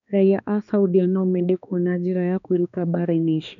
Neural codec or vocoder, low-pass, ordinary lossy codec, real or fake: codec, 16 kHz, 2 kbps, X-Codec, HuBERT features, trained on balanced general audio; 7.2 kHz; none; fake